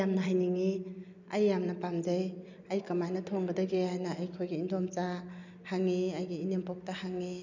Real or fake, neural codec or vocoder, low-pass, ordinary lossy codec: real; none; 7.2 kHz; none